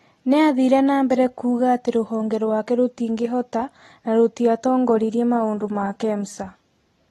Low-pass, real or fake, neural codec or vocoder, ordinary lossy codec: 19.8 kHz; real; none; AAC, 32 kbps